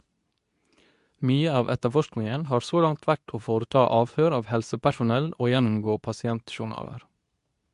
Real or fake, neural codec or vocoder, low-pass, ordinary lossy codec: fake; codec, 24 kHz, 0.9 kbps, WavTokenizer, medium speech release version 2; 10.8 kHz; MP3, 64 kbps